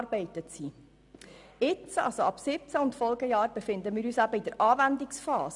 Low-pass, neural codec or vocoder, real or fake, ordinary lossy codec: 10.8 kHz; none; real; none